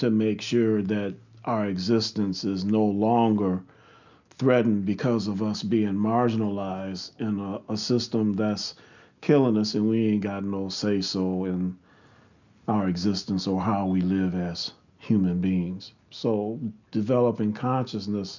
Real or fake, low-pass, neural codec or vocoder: real; 7.2 kHz; none